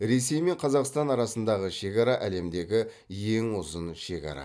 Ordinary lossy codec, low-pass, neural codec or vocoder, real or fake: none; none; none; real